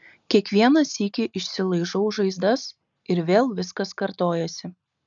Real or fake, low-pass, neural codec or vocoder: real; 7.2 kHz; none